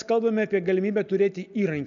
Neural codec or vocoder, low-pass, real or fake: none; 7.2 kHz; real